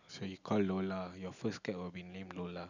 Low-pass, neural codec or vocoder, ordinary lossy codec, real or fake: 7.2 kHz; none; none; real